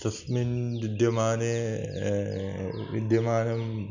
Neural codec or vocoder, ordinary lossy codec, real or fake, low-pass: none; none; real; 7.2 kHz